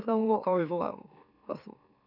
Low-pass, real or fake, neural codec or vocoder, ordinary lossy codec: 5.4 kHz; fake; autoencoder, 44.1 kHz, a latent of 192 numbers a frame, MeloTTS; none